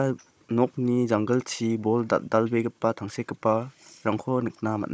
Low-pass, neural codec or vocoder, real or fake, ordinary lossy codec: none; codec, 16 kHz, 16 kbps, FunCodec, trained on Chinese and English, 50 frames a second; fake; none